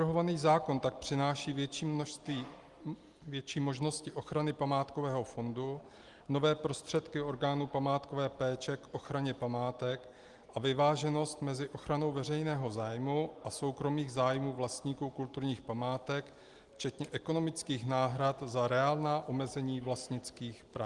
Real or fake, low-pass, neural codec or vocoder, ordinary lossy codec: real; 10.8 kHz; none; Opus, 24 kbps